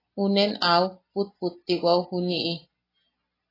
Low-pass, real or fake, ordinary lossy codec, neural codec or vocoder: 5.4 kHz; real; AAC, 24 kbps; none